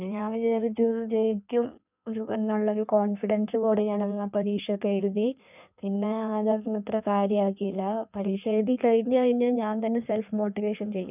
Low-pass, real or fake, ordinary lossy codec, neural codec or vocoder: 3.6 kHz; fake; none; codec, 16 kHz in and 24 kHz out, 1.1 kbps, FireRedTTS-2 codec